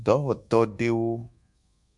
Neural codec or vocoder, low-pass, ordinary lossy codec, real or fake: codec, 24 kHz, 1.2 kbps, DualCodec; 10.8 kHz; MP3, 64 kbps; fake